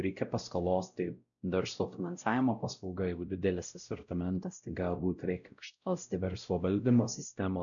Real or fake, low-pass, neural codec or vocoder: fake; 7.2 kHz; codec, 16 kHz, 0.5 kbps, X-Codec, WavLM features, trained on Multilingual LibriSpeech